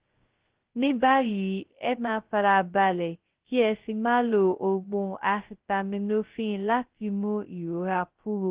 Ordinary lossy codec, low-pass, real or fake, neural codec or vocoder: Opus, 16 kbps; 3.6 kHz; fake; codec, 16 kHz, 0.2 kbps, FocalCodec